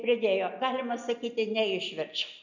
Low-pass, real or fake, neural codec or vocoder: 7.2 kHz; real; none